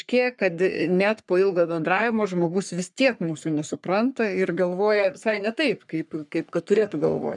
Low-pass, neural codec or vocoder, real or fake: 10.8 kHz; codec, 44.1 kHz, 3.4 kbps, Pupu-Codec; fake